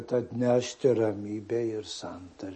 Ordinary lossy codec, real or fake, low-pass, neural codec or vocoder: MP3, 32 kbps; real; 10.8 kHz; none